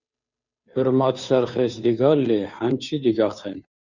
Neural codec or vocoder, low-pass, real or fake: codec, 16 kHz, 2 kbps, FunCodec, trained on Chinese and English, 25 frames a second; 7.2 kHz; fake